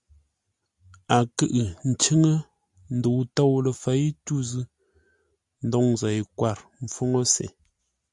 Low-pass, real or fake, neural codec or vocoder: 10.8 kHz; real; none